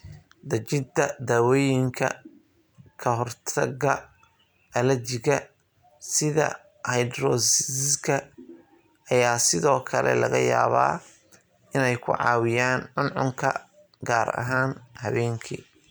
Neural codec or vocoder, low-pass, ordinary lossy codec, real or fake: none; none; none; real